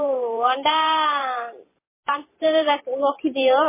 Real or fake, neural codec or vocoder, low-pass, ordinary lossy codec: fake; vocoder, 44.1 kHz, 128 mel bands every 512 samples, BigVGAN v2; 3.6 kHz; MP3, 16 kbps